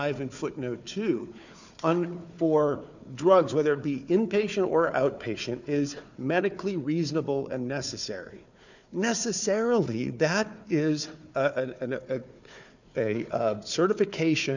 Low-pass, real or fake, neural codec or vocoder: 7.2 kHz; fake; codec, 16 kHz, 4 kbps, FunCodec, trained on Chinese and English, 50 frames a second